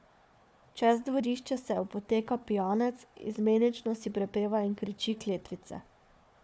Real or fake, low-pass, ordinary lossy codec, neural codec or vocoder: fake; none; none; codec, 16 kHz, 4 kbps, FunCodec, trained on Chinese and English, 50 frames a second